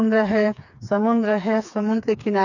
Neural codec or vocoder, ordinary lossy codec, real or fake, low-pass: codec, 16 kHz, 4 kbps, FreqCodec, smaller model; none; fake; 7.2 kHz